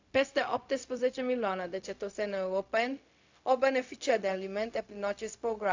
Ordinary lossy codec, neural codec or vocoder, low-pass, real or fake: AAC, 48 kbps; codec, 16 kHz, 0.4 kbps, LongCat-Audio-Codec; 7.2 kHz; fake